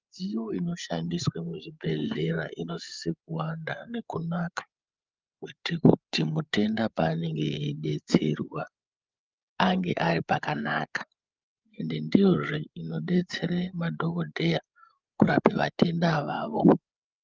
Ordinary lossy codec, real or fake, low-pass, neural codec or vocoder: Opus, 32 kbps; fake; 7.2 kHz; codec, 16 kHz, 8 kbps, FreqCodec, larger model